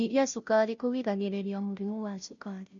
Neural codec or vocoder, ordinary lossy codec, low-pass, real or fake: codec, 16 kHz, 0.5 kbps, FunCodec, trained on Chinese and English, 25 frames a second; none; 7.2 kHz; fake